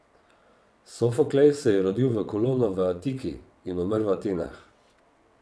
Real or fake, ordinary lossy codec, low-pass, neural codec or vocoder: fake; none; none; vocoder, 22.05 kHz, 80 mel bands, WaveNeXt